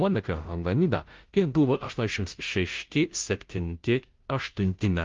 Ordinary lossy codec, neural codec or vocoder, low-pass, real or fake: Opus, 32 kbps; codec, 16 kHz, 0.5 kbps, FunCodec, trained on Chinese and English, 25 frames a second; 7.2 kHz; fake